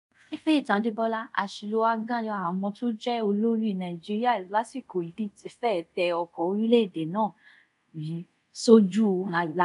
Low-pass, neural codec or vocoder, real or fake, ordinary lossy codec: 10.8 kHz; codec, 24 kHz, 0.5 kbps, DualCodec; fake; none